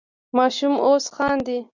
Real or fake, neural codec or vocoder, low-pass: real; none; 7.2 kHz